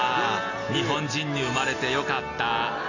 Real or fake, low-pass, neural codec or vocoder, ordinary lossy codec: real; 7.2 kHz; none; none